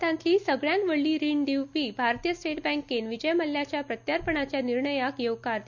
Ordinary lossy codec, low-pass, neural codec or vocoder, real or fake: none; 7.2 kHz; none; real